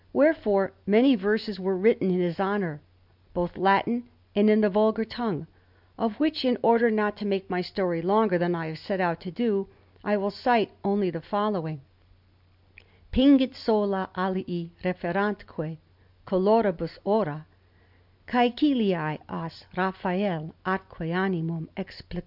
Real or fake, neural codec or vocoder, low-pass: real; none; 5.4 kHz